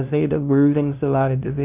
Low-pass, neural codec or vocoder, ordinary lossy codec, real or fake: 3.6 kHz; codec, 16 kHz, 0.5 kbps, FunCodec, trained on LibriTTS, 25 frames a second; none; fake